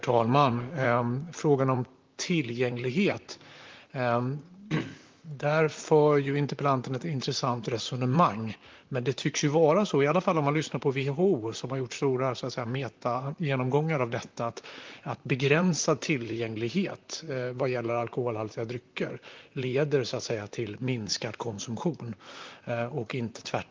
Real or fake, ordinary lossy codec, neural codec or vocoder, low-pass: fake; Opus, 32 kbps; vocoder, 44.1 kHz, 128 mel bands, Pupu-Vocoder; 7.2 kHz